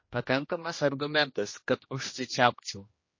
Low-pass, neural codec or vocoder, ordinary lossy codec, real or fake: 7.2 kHz; codec, 16 kHz, 1 kbps, X-Codec, HuBERT features, trained on general audio; MP3, 32 kbps; fake